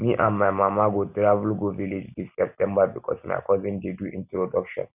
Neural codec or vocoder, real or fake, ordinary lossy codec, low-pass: none; real; none; 3.6 kHz